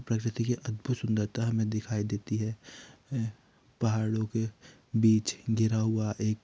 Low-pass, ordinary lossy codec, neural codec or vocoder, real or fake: none; none; none; real